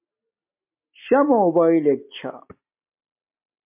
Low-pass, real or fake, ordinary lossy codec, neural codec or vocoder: 3.6 kHz; real; MP3, 32 kbps; none